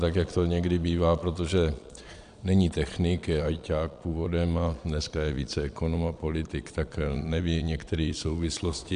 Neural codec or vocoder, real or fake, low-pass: vocoder, 22.05 kHz, 80 mel bands, Vocos; fake; 9.9 kHz